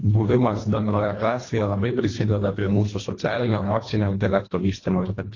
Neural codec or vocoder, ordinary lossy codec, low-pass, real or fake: codec, 24 kHz, 1.5 kbps, HILCodec; AAC, 32 kbps; 7.2 kHz; fake